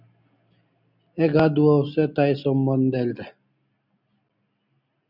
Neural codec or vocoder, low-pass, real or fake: none; 5.4 kHz; real